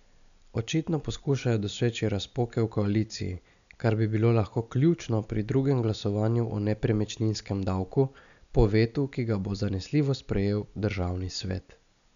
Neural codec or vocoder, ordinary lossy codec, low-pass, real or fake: none; none; 7.2 kHz; real